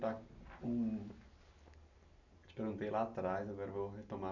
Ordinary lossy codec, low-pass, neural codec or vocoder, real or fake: none; 7.2 kHz; none; real